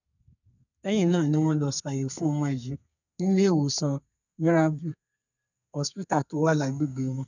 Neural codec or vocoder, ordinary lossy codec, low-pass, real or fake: codec, 32 kHz, 1.9 kbps, SNAC; none; 7.2 kHz; fake